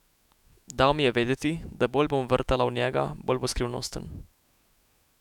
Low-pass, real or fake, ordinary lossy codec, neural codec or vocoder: 19.8 kHz; fake; none; autoencoder, 48 kHz, 128 numbers a frame, DAC-VAE, trained on Japanese speech